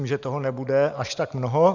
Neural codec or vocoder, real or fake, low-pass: none; real; 7.2 kHz